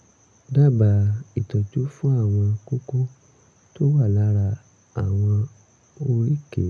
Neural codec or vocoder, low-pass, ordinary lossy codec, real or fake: none; none; none; real